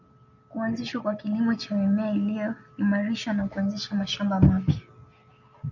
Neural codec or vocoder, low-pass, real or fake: none; 7.2 kHz; real